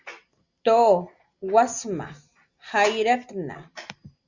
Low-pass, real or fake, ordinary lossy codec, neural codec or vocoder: 7.2 kHz; real; Opus, 64 kbps; none